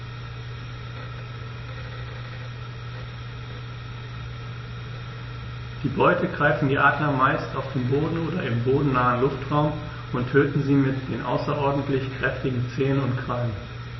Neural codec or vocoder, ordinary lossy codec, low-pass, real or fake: none; MP3, 24 kbps; 7.2 kHz; real